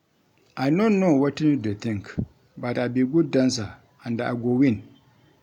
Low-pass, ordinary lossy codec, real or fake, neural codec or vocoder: 19.8 kHz; none; real; none